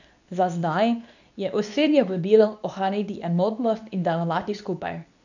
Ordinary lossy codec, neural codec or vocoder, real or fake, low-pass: none; codec, 24 kHz, 0.9 kbps, WavTokenizer, medium speech release version 2; fake; 7.2 kHz